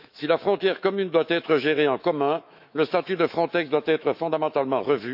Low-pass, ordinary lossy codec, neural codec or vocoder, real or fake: 5.4 kHz; none; autoencoder, 48 kHz, 128 numbers a frame, DAC-VAE, trained on Japanese speech; fake